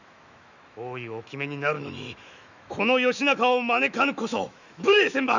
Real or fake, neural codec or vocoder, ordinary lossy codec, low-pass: fake; autoencoder, 48 kHz, 128 numbers a frame, DAC-VAE, trained on Japanese speech; none; 7.2 kHz